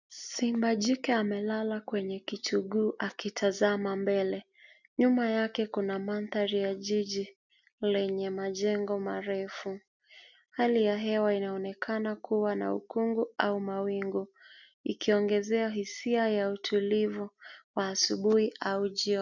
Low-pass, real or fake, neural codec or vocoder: 7.2 kHz; real; none